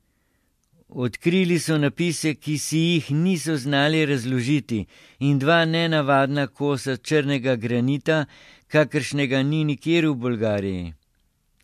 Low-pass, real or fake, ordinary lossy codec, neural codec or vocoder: 14.4 kHz; real; MP3, 64 kbps; none